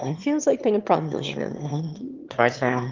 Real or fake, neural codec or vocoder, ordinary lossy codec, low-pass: fake; autoencoder, 22.05 kHz, a latent of 192 numbers a frame, VITS, trained on one speaker; Opus, 24 kbps; 7.2 kHz